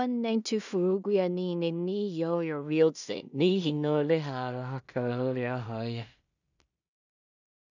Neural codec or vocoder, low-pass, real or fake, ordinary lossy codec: codec, 16 kHz in and 24 kHz out, 0.4 kbps, LongCat-Audio-Codec, two codebook decoder; 7.2 kHz; fake; none